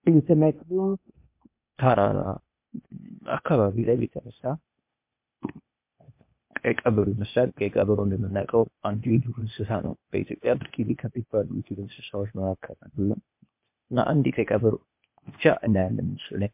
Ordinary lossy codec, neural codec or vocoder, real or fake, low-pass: MP3, 32 kbps; codec, 16 kHz, 0.8 kbps, ZipCodec; fake; 3.6 kHz